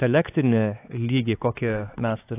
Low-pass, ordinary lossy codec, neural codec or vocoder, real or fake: 3.6 kHz; AAC, 24 kbps; codec, 16 kHz, 4 kbps, X-Codec, HuBERT features, trained on LibriSpeech; fake